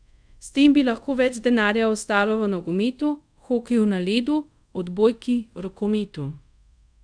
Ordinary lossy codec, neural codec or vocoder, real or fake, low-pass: none; codec, 24 kHz, 0.5 kbps, DualCodec; fake; 9.9 kHz